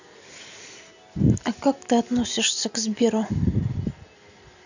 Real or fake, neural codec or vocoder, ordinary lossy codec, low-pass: real; none; none; 7.2 kHz